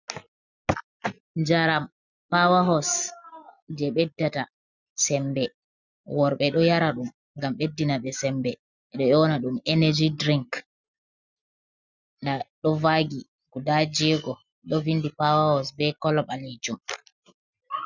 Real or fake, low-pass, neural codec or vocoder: real; 7.2 kHz; none